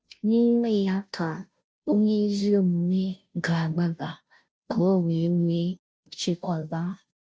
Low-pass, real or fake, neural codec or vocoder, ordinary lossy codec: none; fake; codec, 16 kHz, 0.5 kbps, FunCodec, trained on Chinese and English, 25 frames a second; none